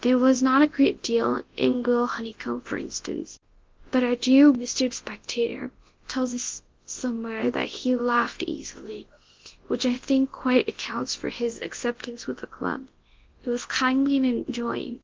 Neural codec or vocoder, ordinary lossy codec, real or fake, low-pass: codec, 24 kHz, 0.9 kbps, WavTokenizer, large speech release; Opus, 16 kbps; fake; 7.2 kHz